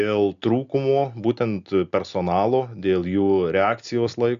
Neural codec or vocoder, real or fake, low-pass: none; real; 7.2 kHz